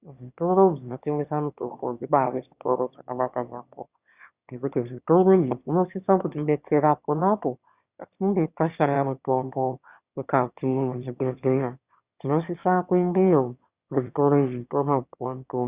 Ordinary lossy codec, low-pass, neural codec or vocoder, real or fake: Opus, 64 kbps; 3.6 kHz; autoencoder, 22.05 kHz, a latent of 192 numbers a frame, VITS, trained on one speaker; fake